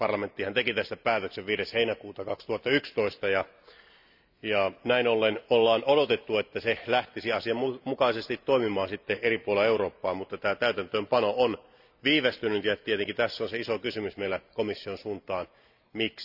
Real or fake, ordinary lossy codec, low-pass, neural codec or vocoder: real; none; 5.4 kHz; none